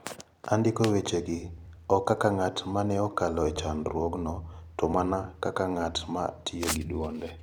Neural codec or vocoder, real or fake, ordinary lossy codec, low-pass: vocoder, 44.1 kHz, 128 mel bands every 256 samples, BigVGAN v2; fake; none; 19.8 kHz